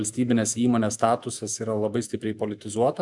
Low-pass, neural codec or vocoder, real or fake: 10.8 kHz; autoencoder, 48 kHz, 128 numbers a frame, DAC-VAE, trained on Japanese speech; fake